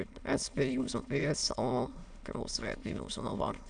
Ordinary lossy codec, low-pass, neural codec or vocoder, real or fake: none; 9.9 kHz; autoencoder, 22.05 kHz, a latent of 192 numbers a frame, VITS, trained on many speakers; fake